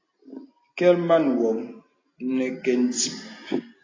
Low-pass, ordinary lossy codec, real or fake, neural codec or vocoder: 7.2 kHz; AAC, 32 kbps; real; none